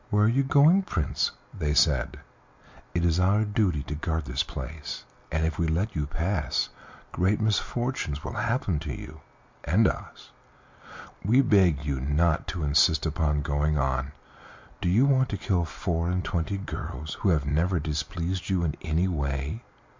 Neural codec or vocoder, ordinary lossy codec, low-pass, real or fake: none; MP3, 64 kbps; 7.2 kHz; real